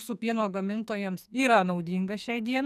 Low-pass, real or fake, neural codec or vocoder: 14.4 kHz; fake; codec, 44.1 kHz, 2.6 kbps, SNAC